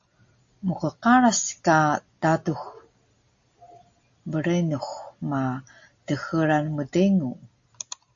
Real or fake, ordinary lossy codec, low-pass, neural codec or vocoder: real; AAC, 48 kbps; 7.2 kHz; none